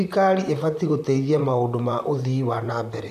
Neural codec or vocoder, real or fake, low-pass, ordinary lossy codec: vocoder, 44.1 kHz, 128 mel bands, Pupu-Vocoder; fake; 14.4 kHz; none